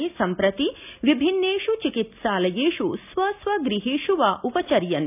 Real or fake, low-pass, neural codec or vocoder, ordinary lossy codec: real; 3.6 kHz; none; AAC, 32 kbps